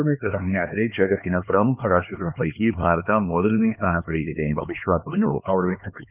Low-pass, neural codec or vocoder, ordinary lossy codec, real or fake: 3.6 kHz; codec, 16 kHz, 2 kbps, X-Codec, HuBERT features, trained on LibriSpeech; none; fake